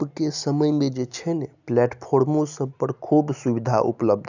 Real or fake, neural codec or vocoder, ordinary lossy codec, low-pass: real; none; none; 7.2 kHz